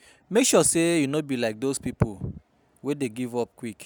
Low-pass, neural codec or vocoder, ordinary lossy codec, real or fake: none; none; none; real